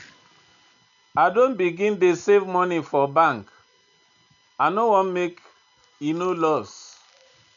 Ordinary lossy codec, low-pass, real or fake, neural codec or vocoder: none; 7.2 kHz; real; none